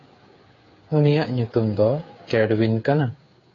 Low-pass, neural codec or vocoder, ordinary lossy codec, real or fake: 7.2 kHz; codec, 16 kHz, 8 kbps, FreqCodec, smaller model; AAC, 32 kbps; fake